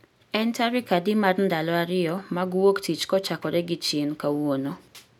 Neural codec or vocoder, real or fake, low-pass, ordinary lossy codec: none; real; 19.8 kHz; none